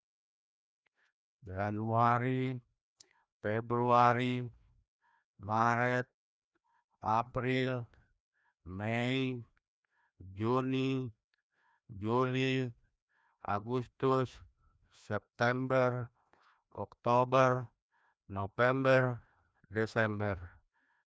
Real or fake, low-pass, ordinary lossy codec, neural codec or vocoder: fake; none; none; codec, 16 kHz, 1 kbps, FreqCodec, larger model